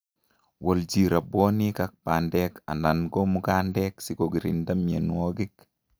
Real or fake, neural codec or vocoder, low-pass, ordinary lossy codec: real; none; none; none